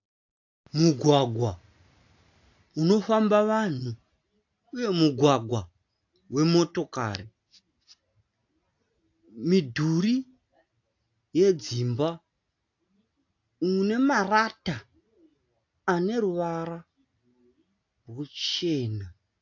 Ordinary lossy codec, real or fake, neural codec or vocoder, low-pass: AAC, 48 kbps; real; none; 7.2 kHz